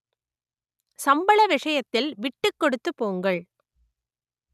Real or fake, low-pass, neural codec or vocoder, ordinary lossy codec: real; 14.4 kHz; none; none